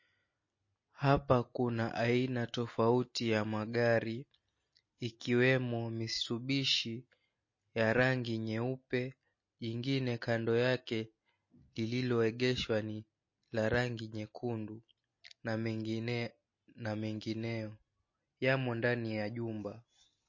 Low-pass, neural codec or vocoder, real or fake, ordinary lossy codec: 7.2 kHz; none; real; MP3, 32 kbps